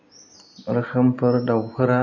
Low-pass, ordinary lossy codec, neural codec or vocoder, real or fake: 7.2 kHz; AAC, 48 kbps; none; real